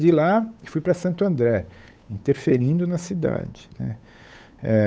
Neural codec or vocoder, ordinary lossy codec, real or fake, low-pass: codec, 16 kHz, 8 kbps, FunCodec, trained on Chinese and English, 25 frames a second; none; fake; none